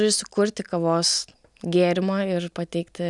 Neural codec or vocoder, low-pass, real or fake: none; 10.8 kHz; real